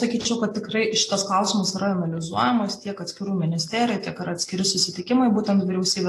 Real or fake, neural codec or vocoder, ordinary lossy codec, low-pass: real; none; AAC, 48 kbps; 14.4 kHz